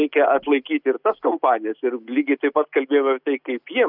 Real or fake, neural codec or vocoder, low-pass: real; none; 5.4 kHz